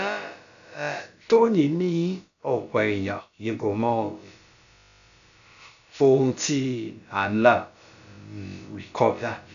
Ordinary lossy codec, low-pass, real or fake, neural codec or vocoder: none; 7.2 kHz; fake; codec, 16 kHz, about 1 kbps, DyCAST, with the encoder's durations